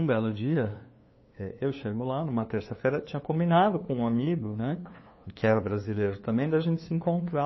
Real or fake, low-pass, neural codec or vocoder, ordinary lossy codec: fake; 7.2 kHz; codec, 16 kHz, 2 kbps, FunCodec, trained on LibriTTS, 25 frames a second; MP3, 24 kbps